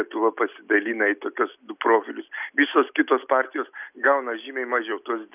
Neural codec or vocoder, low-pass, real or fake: none; 3.6 kHz; real